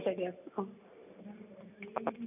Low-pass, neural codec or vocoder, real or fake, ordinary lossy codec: 3.6 kHz; none; real; none